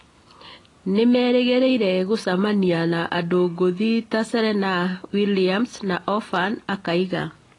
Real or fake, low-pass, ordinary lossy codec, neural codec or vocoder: real; 10.8 kHz; AAC, 32 kbps; none